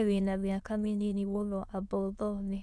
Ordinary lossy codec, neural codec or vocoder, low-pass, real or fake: none; autoencoder, 22.05 kHz, a latent of 192 numbers a frame, VITS, trained on many speakers; none; fake